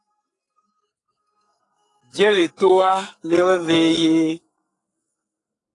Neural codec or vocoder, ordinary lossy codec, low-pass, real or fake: codec, 44.1 kHz, 2.6 kbps, SNAC; AAC, 48 kbps; 10.8 kHz; fake